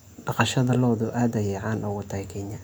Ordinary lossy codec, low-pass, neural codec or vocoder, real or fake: none; none; none; real